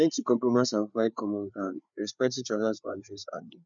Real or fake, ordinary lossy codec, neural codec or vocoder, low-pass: fake; none; codec, 16 kHz, 4 kbps, FreqCodec, larger model; 7.2 kHz